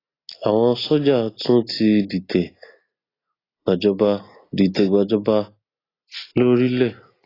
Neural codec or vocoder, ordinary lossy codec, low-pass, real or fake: none; AAC, 24 kbps; 5.4 kHz; real